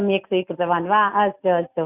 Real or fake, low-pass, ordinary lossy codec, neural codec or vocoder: real; 3.6 kHz; none; none